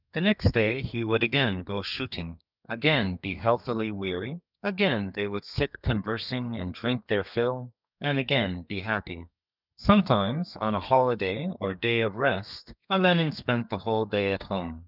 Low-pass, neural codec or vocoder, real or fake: 5.4 kHz; codec, 32 kHz, 1.9 kbps, SNAC; fake